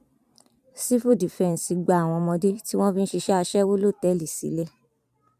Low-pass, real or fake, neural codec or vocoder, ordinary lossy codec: 14.4 kHz; fake; vocoder, 44.1 kHz, 128 mel bands every 512 samples, BigVGAN v2; none